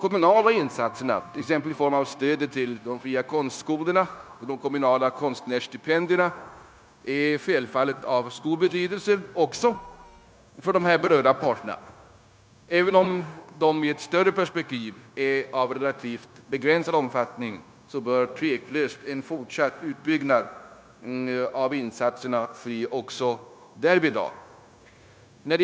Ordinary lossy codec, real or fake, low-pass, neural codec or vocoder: none; fake; none; codec, 16 kHz, 0.9 kbps, LongCat-Audio-Codec